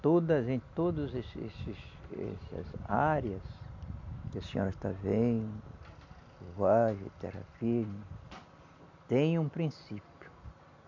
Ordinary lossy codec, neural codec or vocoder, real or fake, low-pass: MP3, 64 kbps; none; real; 7.2 kHz